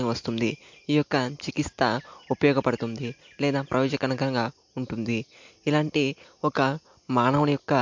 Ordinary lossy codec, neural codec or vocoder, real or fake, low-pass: MP3, 48 kbps; none; real; 7.2 kHz